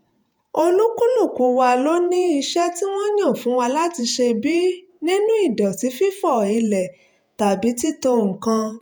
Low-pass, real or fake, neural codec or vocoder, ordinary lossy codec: none; fake; vocoder, 48 kHz, 128 mel bands, Vocos; none